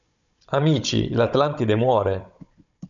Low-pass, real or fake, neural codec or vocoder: 7.2 kHz; fake; codec, 16 kHz, 16 kbps, FunCodec, trained on Chinese and English, 50 frames a second